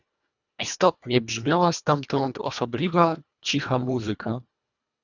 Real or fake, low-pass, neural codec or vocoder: fake; 7.2 kHz; codec, 24 kHz, 1.5 kbps, HILCodec